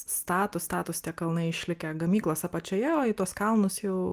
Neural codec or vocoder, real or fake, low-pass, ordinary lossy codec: none; real; 14.4 kHz; Opus, 32 kbps